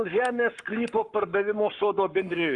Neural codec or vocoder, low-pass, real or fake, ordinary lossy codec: codec, 44.1 kHz, 7.8 kbps, Pupu-Codec; 10.8 kHz; fake; Opus, 32 kbps